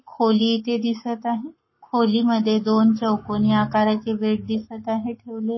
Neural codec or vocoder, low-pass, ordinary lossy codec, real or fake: codec, 44.1 kHz, 7.8 kbps, Pupu-Codec; 7.2 kHz; MP3, 24 kbps; fake